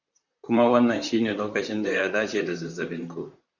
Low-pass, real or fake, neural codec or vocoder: 7.2 kHz; fake; vocoder, 44.1 kHz, 128 mel bands, Pupu-Vocoder